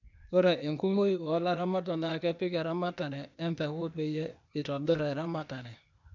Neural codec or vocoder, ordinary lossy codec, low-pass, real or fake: codec, 16 kHz, 0.8 kbps, ZipCodec; none; 7.2 kHz; fake